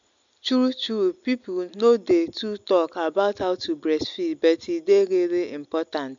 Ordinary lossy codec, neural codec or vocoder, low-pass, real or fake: MP3, 64 kbps; none; 7.2 kHz; real